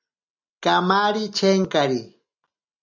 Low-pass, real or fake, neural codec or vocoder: 7.2 kHz; real; none